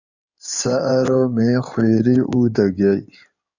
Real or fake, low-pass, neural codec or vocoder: fake; 7.2 kHz; codec, 16 kHz in and 24 kHz out, 2.2 kbps, FireRedTTS-2 codec